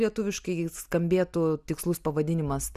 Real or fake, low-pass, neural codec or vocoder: real; 14.4 kHz; none